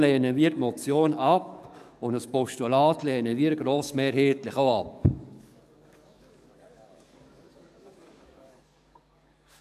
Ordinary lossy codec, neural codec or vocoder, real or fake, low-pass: none; codec, 44.1 kHz, 7.8 kbps, DAC; fake; 14.4 kHz